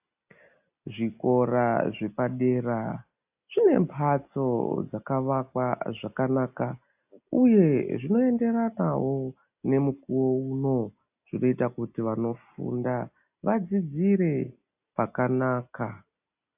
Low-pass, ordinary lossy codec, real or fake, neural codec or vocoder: 3.6 kHz; AAC, 32 kbps; real; none